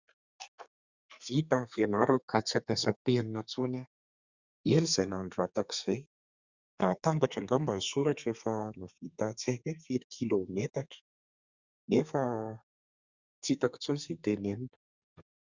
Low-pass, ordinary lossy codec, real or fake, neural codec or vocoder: 7.2 kHz; Opus, 64 kbps; fake; codec, 32 kHz, 1.9 kbps, SNAC